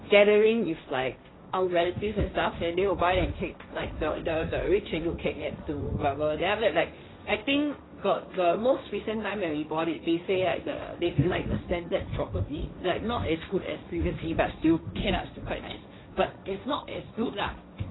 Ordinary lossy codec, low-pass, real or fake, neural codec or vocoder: AAC, 16 kbps; 7.2 kHz; fake; codec, 16 kHz, 1.1 kbps, Voila-Tokenizer